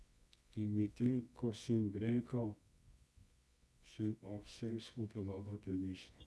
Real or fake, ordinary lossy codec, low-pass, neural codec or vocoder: fake; none; none; codec, 24 kHz, 0.9 kbps, WavTokenizer, medium music audio release